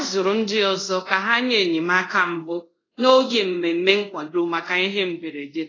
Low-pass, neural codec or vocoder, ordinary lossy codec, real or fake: 7.2 kHz; codec, 24 kHz, 0.5 kbps, DualCodec; AAC, 32 kbps; fake